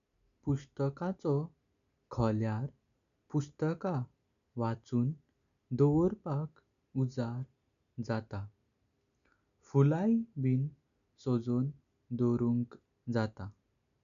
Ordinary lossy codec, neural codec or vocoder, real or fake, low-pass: none; none; real; 7.2 kHz